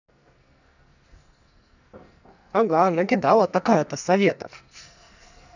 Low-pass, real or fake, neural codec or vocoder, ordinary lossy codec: 7.2 kHz; fake; codec, 44.1 kHz, 2.6 kbps, SNAC; none